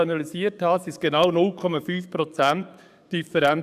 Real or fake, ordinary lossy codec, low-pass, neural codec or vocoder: fake; none; 14.4 kHz; codec, 44.1 kHz, 7.8 kbps, DAC